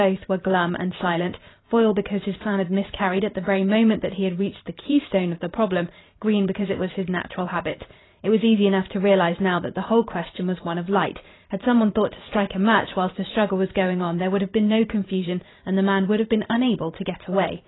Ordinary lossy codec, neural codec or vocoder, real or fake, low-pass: AAC, 16 kbps; vocoder, 44.1 kHz, 128 mel bands every 512 samples, BigVGAN v2; fake; 7.2 kHz